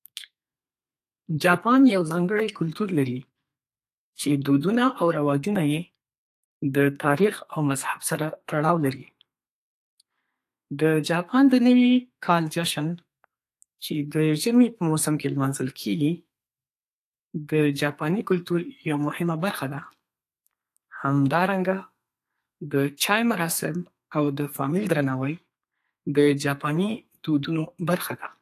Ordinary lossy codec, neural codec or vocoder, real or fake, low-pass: AAC, 64 kbps; codec, 32 kHz, 1.9 kbps, SNAC; fake; 14.4 kHz